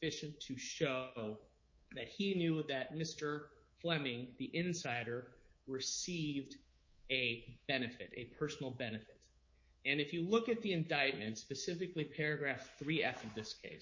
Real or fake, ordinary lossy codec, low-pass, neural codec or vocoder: fake; MP3, 32 kbps; 7.2 kHz; codec, 24 kHz, 3.1 kbps, DualCodec